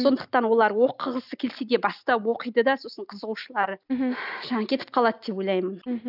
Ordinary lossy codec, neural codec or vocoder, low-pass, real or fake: none; none; 5.4 kHz; real